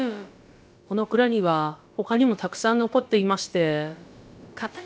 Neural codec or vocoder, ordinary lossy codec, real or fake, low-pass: codec, 16 kHz, about 1 kbps, DyCAST, with the encoder's durations; none; fake; none